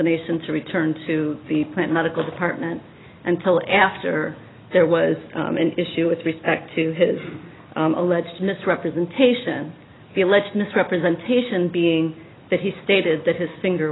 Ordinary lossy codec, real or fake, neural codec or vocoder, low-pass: AAC, 16 kbps; real; none; 7.2 kHz